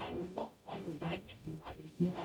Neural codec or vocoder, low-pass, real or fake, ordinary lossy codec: codec, 44.1 kHz, 0.9 kbps, DAC; 19.8 kHz; fake; none